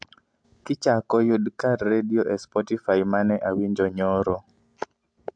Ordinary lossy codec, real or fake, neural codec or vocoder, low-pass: MP3, 64 kbps; real; none; 9.9 kHz